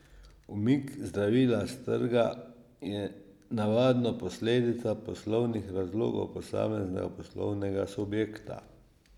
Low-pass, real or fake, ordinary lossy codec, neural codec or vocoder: 19.8 kHz; real; none; none